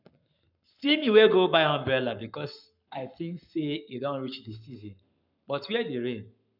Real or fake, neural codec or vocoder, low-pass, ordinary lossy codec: fake; codec, 44.1 kHz, 7.8 kbps, Pupu-Codec; 5.4 kHz; none